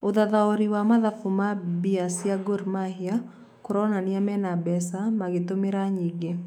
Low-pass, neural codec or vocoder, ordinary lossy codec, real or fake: 19.8 kHz; autoencoder, 48 kHz, 128 numbers a frame, DAC-VAE, trained on Japanese speech; none; fake